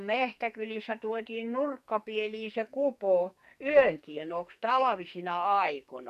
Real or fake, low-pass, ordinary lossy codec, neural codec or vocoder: fake; 14.4 kHz; none; codec, 44.1 kHz, 2.6 kbps, SNAC